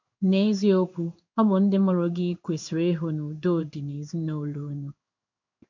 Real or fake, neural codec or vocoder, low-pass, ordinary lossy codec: fake; codec, 16 kHz in and 24 kHz out, 1 kbps, XY-Tokenizer; 7.2 kHz; MP3, 64 kbps